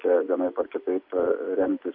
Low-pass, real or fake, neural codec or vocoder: 5.4 kHz; fake; vocoder, 44.1 kHz, 128 mel bands every 256 samples, BigVGAN v2